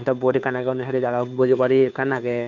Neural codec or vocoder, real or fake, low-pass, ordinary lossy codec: codec, 16 kHz, 2 kbps, FunCodec, trained on Chinese and English, 25 frames a second; fake; 7.2 kHz; none